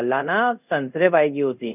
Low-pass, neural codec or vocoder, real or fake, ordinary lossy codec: 3.6 kHz; codec, 24 kHz, 0.5 kbps, DualCodec; fake; none